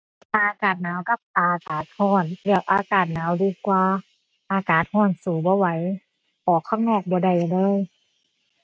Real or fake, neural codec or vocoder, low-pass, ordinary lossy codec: real; none; none; none